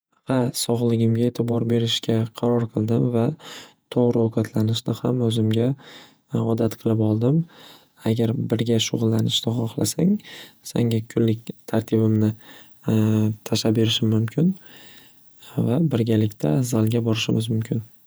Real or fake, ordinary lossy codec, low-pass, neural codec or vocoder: fake; none; none; vocoder, 48 kHz, 128 mel bands, Vocos